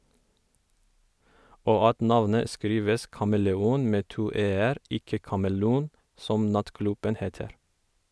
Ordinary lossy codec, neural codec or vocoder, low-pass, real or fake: none; none; none; real